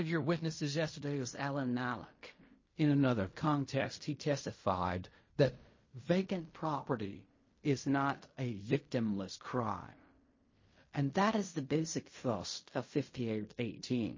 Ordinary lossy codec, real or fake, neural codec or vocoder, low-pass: MP3, 32 kbps; fake; codec, 16 kHz in and 24 kHz out, 0.4 kbps, LongCat-Audio-Codec, fine tuned four codebook decoder; 7.2 kHz